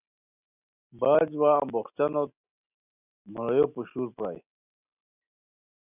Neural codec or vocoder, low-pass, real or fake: none; 3.6 kHz; real